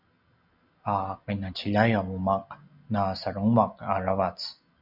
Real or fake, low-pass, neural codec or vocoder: real; 5.4 kHz; none